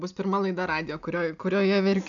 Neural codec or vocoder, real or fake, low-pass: none; real; 7.2 kHz